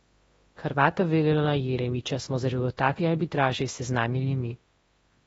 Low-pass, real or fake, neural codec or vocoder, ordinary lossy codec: 10.8 kHz; fake; codec, 24 kHz, 0.9 kbps, WavTokenizer, large speech release; AAC, 24 kbps